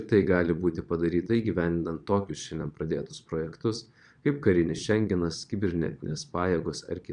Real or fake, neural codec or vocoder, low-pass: fake; vocoder, 22.05 kHz, 80 mel bands, Vocos; 9.9 kHz